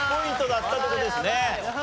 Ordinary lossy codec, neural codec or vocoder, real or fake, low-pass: none; none; real; none